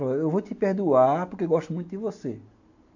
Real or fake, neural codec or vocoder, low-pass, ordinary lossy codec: real; none; 7.2 kHz; AAC, 48 kbps